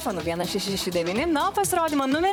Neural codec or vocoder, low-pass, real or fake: vocoder, 44.1 kHz, 128 mel bands, Pupu-Vocoder; 19.8 kHz; fake